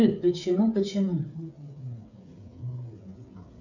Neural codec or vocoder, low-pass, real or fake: codec, 16 kHz, 8 kbps, FreqCodec, smaller model; 7.2 kHz; fake